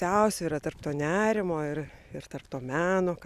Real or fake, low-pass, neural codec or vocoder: real; 14.4 kHz; none